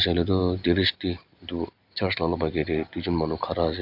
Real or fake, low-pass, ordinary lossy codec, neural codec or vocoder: real; 5.4 kHz; none; none